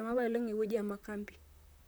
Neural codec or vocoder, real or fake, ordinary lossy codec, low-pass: vocoder, 44.1 kHz, 128 mel bands, Pupu-Vocoder; fake; none; none